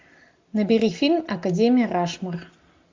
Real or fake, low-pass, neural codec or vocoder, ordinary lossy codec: real; 7.2 kHz; none; MP3, 64 kbps